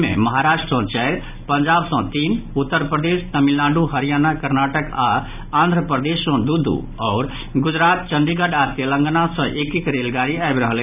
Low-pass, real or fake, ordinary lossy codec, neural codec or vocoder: 3.6 kHz; real; none; none